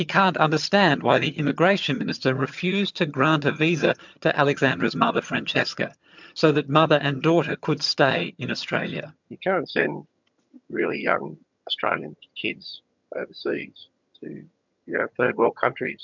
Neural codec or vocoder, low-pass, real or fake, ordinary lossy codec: vocoder, 22.05 kHz, 80 mel bands, HiFi-GAN; 7.2 kHz; fake; MP3, 64 kbps